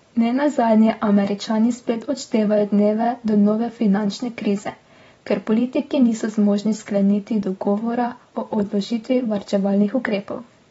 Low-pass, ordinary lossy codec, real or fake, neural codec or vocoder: 19.8 kHz; AAC, 24 kbps; fake; vocoder, 44.1 kHz, 128 mel bands every 512 samples, BigVGAN v2